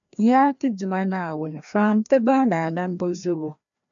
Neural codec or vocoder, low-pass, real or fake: codec, 16 kHz, 1 kbps, FreqCodec, larger model; 7.2 kHz; fake